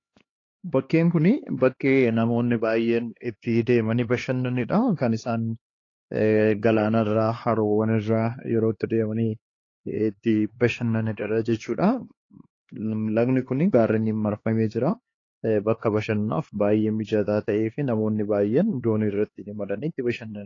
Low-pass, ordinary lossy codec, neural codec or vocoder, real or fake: 7.2 kHz; AAC, 32 kbps; codec, 16 kHz, 2 kbps, X-Codec, HuBERT features, trained on LibriSpeech; fake